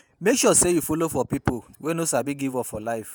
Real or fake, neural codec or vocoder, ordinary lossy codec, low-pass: fake; vocoder, 48 kHz, 128 mel bands, Vocos; none; none